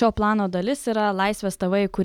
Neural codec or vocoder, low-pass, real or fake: none; 19.8 kHz; real